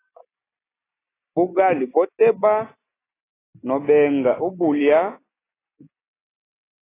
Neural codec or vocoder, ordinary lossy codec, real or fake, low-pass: none; AAC, 16 kbps; real; 3.6 kHz